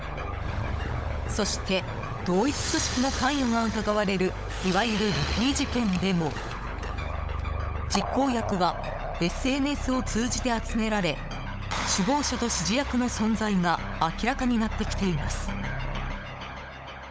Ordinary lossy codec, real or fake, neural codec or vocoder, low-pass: none; fake; codec, 16 kHz, 16 kbps, FunCodec, trained on LibriTTS, 50 frames a second; none